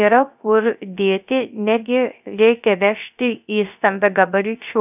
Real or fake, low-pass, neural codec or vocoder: fake; 3.6 kHz; codec, 16 kHz, 0.3 kbps, FocalCodec